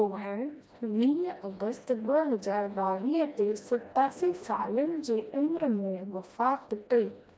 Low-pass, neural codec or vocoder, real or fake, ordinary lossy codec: none; codec, 16 kHz, 1 kbps, FreqCodec, smaller model; fake; none